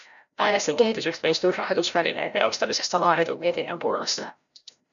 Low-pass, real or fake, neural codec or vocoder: 7.2 kHz; fake; codec, 16 kHz, 0.5 kbps, FreqCodec, larger model